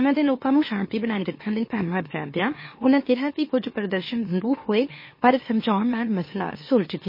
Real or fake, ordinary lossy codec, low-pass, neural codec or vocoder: fake; MP3, 24 kbps; 5.4 kHz; autoencoder, 44.1 kHz, a latent of 192 numbers a frame, MeloTTS